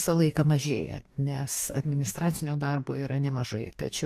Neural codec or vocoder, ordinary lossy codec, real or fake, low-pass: codec, 44.1 kHz, 2.6 kbps, DAC; AAC, 96 kbps; fake; 14.4 kHz